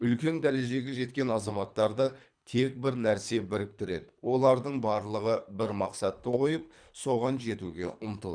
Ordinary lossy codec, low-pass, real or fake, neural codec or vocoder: none; 9.9 kHz; fake; codec, 24 kHz, 3 kbps, HILCodec